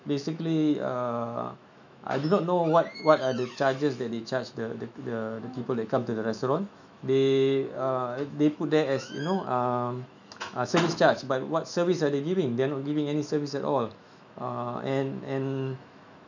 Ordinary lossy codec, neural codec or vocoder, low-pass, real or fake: none; autoencoder, 48 kHz, 128 numbers a frame, DAC-VAE, trained on Japanese speech; 7.2 kHz; fake